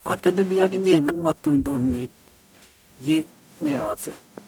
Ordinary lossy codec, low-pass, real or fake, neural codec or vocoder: none; none; fake; codec, 44.1 kHz, 0.9 kbps, DAC